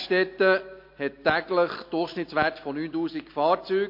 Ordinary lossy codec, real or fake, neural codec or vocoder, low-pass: MP3, 32 kbps; real; none; 5.4 kHz